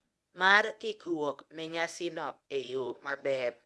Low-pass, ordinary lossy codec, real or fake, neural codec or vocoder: none; none; fake; codec, 24 kHz, 0.9 kbps, WavTokenizer, medium speech release version 1